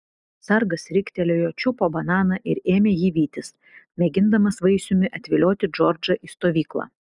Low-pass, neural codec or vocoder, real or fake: 10.8 kHz; none; real